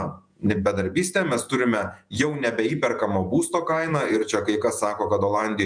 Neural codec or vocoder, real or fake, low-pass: vocoder, 48 kHz, 128 mel bands, Vocos; fake; 9.9 kHz